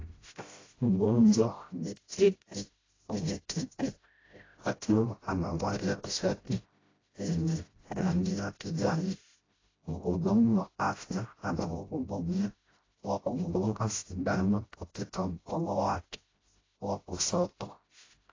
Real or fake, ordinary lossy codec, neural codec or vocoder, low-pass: fake; AAC, 32 kbps; codec, 16 kHz, 0.5 kbps, FreqCodec, smaller model; 7.2 kHz